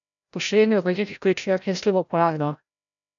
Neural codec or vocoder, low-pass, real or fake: codec, 16 kHz, 0.5 kbps, FreqCodec, larger model; 7.2 kHz; fake